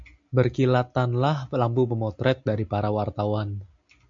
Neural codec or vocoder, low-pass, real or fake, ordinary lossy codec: none; 7.2 kHz; real; MP3, 64 kbps